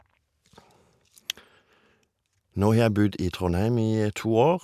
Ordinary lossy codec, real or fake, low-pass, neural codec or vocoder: none; real; 14.4 kHz; none